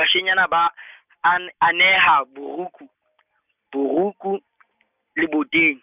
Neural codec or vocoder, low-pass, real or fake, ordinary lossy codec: none; 3.6 kHz; real; none